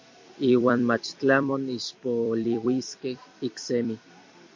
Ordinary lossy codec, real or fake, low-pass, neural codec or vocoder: MP3, 48 kbps; fake; 7.2 kHz; vocoder, 44.1 kHz, 128 mel bands every 256 samples, BigVGAN v2